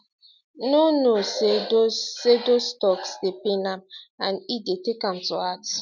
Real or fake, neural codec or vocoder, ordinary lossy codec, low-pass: real; none; none; 7.2 kHz